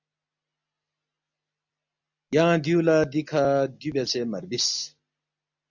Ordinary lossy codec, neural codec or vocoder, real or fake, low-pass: MP3, 64 kbps; none; real; 7.2 kHz